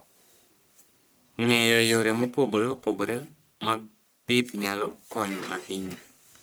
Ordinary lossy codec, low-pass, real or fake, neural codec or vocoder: none; none; fake; codec, 44.1 kHz, 1.7 kbps, Pupu-Codec